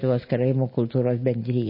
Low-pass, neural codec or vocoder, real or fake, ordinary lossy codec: 5.4 kHz; none; real; MP3, 24 kbps